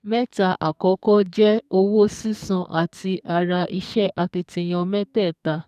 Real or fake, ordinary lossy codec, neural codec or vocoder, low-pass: fake; none; codec, 44.1 kHz, 2.6 kbps, SNAC; 14.4 kHz